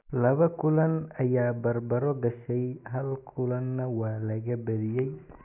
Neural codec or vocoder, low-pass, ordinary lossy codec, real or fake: none; 3.6 kHz; none; real